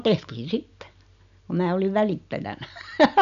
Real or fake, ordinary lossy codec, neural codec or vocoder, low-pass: real; none; none; 7.2 kHz